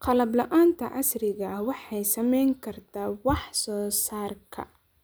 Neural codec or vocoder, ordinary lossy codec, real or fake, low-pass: none; none; real; none